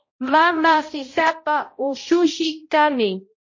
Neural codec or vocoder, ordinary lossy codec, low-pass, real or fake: codec, 16 kHz, 0.5 kbps, X-Codec, HuBERT features, trained on balanced general audio; MP3, 32 kbps; 7.2 kHz; fake